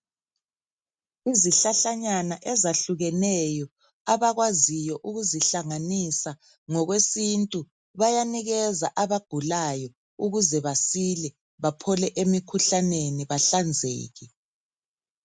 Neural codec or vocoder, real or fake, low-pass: none; real; 9.9 kHz